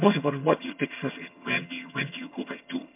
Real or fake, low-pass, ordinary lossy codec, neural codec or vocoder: fake; 3.6 kHz; MP3, 32 kbps; vocoder, 22.05 kHz, 80 mel bands, HiFi-GAN